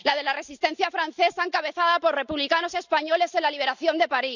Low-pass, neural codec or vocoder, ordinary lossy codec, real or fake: 7.2 kHz; none; none; real